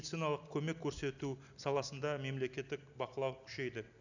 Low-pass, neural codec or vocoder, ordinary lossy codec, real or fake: 7.2 kHz; none; none; real